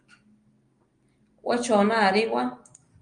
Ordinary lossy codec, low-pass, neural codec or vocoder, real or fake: Opus, 32 kbps; 9.9 kHz; none; real